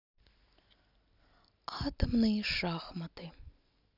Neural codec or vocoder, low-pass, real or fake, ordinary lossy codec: none; 5.4 kHz; real; none